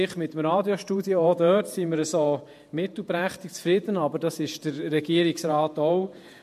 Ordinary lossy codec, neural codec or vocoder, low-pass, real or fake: MP3, 64 kbps; vocoder, 48 kHz, 128 mel bands, Vocos; 14.4 kHz; fake